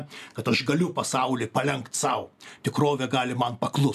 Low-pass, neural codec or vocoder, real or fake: 14.4 kHz; none; real